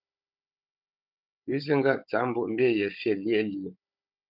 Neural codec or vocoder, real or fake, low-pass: codec, 16 kHz, 16 kbps, FunCodec, trained on Chinese and English, 50 frames a second; fake; 5.4 kHz